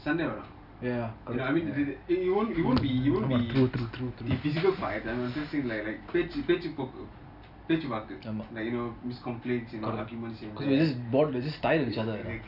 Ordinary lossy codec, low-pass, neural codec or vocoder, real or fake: none; 5.4 kHz; none; real